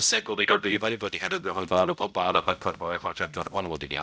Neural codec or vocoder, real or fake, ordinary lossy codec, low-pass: codec, 16 kHz, 0.5 kbps, X-Codec, HuBERT features, trained on balanced general audio; fake; none; none